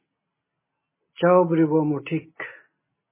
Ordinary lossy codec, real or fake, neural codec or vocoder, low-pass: MP3, 16 kbps; real; none; 3.6 kHz